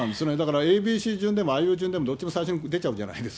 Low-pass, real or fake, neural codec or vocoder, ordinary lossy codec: none; real; none; none